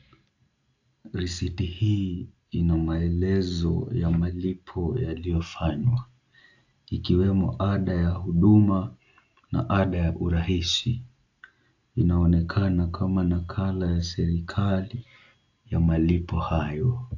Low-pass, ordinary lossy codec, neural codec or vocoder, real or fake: 7.2 kHz; AAC, 48 kbps; none; real